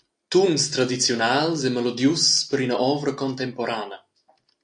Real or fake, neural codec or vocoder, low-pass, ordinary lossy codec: real; none; 9.9 kHz; AAC, 64 kbps